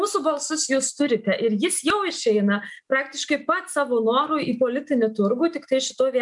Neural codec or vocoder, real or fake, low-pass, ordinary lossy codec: none; real; 10.8 kHz; MP3, 96 kbps